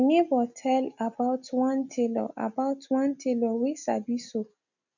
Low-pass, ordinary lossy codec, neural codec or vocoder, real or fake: 7.2 kHz; none; none; real